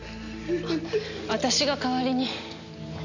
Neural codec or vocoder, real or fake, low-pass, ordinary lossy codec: none; real; 7.2 kHz; none